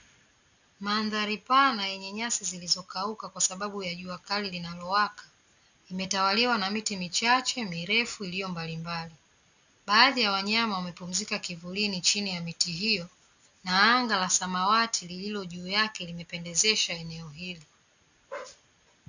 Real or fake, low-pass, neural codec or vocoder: real; 7.2 kHz; none